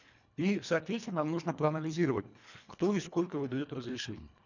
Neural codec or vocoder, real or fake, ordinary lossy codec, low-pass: codec, 24 kHz, 1.5 kbps, HILCodec; fake; none; 7.2 kHz